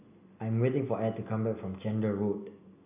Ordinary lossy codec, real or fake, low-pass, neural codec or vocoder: AAC, 24 kbps; real; 3.6 kHz; none